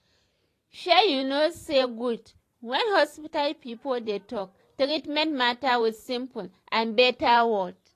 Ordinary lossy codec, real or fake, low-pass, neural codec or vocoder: AAC, 48 kbps; fake; 14.4 kHz; vocoder, 44.1 kHz, 128 mel bands every 512 samples, BigVGAN v2